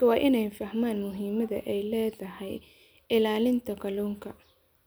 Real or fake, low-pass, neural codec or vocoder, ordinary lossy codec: real; none; none; none